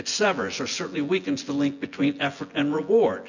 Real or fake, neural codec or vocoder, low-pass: fake; vocoder, 24 kHz, 100 mel bands, Vocos; 7.2 kHz